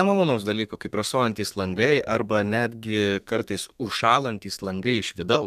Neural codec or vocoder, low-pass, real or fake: codec, 32 kHz, 1.9 kbps, SNAC; 14.4 kHz; fake